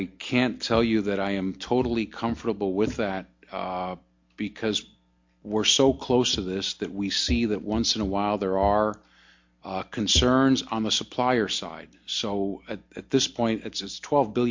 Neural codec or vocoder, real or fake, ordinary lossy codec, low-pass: none; real; MP3, 48 kbps; 7.2 kHz